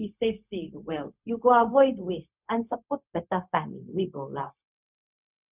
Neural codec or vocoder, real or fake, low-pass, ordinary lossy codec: codec, 16 kHz, 0.4 kbps, LongCat-Audio-Codec; fake; 3.6 kHz; none